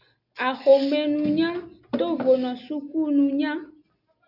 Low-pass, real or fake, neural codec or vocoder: 5.4 kHz; real; none